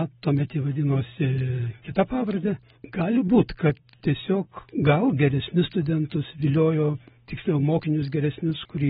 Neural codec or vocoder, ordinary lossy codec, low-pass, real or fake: none; AAC, 16 kbps; 19.8 kHz; real